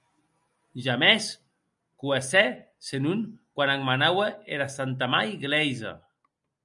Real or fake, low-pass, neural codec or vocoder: real; 10.8 kHz; none